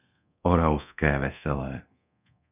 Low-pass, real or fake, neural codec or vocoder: 3.6 kHz; fake; codec, 24 kHz, 0.9 kbps, DualCodec